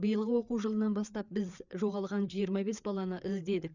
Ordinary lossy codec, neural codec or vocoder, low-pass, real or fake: none; codec, 16 kHz, 4 kbps, FreqCodec, larger model; 7.2 kHz; fake